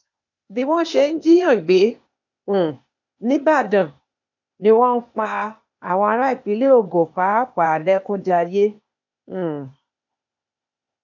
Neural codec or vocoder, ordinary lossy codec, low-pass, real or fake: codec, 16 kHz, 0.8 kbps, ZipCodec; none; 7.2 kHz; fake